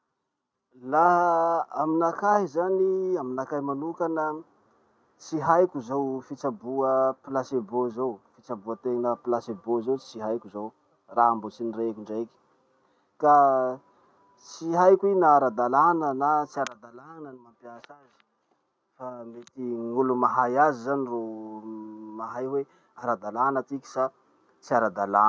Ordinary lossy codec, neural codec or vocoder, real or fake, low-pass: none; none; real; none